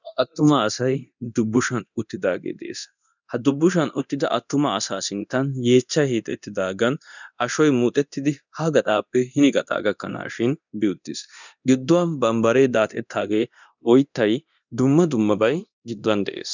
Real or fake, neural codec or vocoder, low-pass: fake; codec, 24 kHz, 0.9 kbps, DualCodec; 7.2 kHz